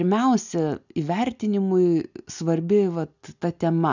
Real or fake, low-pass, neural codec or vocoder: real; 7.2 kHz; none